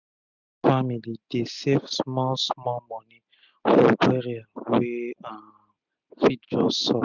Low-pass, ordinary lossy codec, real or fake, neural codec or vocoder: 7.2 kHz; none; real; none